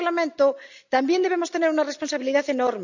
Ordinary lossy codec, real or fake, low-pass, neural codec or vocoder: none; real; 7.2 kHz; none